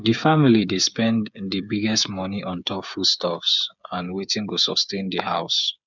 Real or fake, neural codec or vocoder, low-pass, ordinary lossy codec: fake; codec, 16 kHz, 8 kbps, FreqCodec, smaller model; 7.2 kHz; none